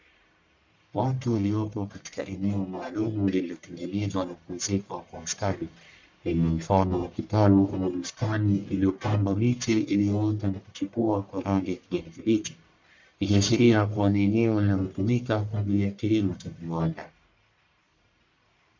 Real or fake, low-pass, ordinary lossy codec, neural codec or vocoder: fake; 7.2 kHz; MP3, 64 kbps; codec, 44.1 kHz, 1.7 kbps, Pupu-Codec